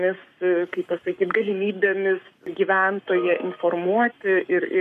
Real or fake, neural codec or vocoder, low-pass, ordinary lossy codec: fake; codec, 44.1 kHz, 7.8 kbps, Pupu-Codec; 14.4 kHz; MP3, 96 kbps